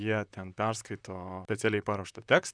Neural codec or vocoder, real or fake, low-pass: vocoder, 44.1 kHz, 128 mel bands, Pupu-Vocoder; fake; 9.9 kHz